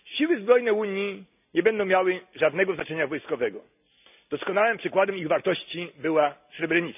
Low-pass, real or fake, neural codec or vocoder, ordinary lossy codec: 3.6 kHz; real; none; none